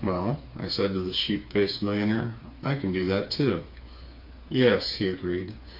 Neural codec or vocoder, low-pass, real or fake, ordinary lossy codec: codec, 16 kHz, 4 kbps, FreqCodec, smaller model; 5.4 kHz; fake; MP3, 32 kbps